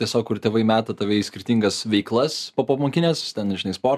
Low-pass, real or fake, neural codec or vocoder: 14.4 kHz; real; none